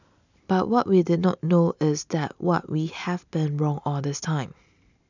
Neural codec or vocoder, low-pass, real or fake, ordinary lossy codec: none; 7.2 kHz; real; none